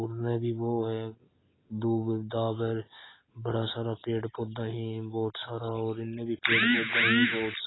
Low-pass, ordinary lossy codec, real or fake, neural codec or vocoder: 7.2 kHz; AAC, 16 kbps; real; none